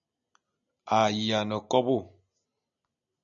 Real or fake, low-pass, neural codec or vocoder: real; 7.2 kHz; none